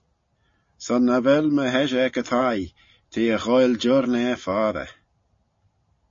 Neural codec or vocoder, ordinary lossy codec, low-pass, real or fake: none; MP3, 32 kbps; 7.2 kHz; real